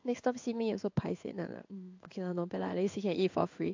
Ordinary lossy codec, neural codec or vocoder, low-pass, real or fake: AAC, 48 kbps; codec, 16 kHz in and 24 kHz out, 1 kbps, XY-Tokenizer; 7.2 kHz; fake